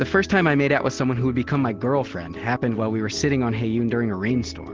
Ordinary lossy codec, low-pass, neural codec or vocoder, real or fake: Opus, 16 kbps; 7.2 kHz; none; real